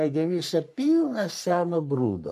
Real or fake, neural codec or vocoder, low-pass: fake; codec, 44.1 kHz, 3.4 kbps, Pupu-Codec; 14.4 kHz